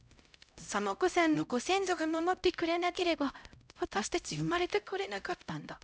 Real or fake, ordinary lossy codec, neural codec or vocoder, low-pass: fake; none; codec, 16 kHz, 0.5 kbps, X-Codec, HuBERT features, trained on LibriSpeech; none